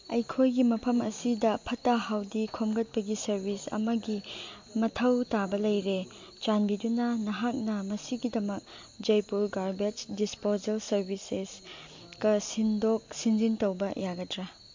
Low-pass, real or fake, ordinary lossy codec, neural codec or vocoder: 7.2 kHz; real; MP3, 48 kbps; none